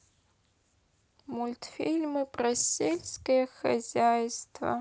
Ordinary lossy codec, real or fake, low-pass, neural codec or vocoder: none; real; none; none